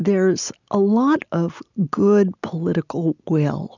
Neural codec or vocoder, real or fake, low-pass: none; real; 7.2 kHz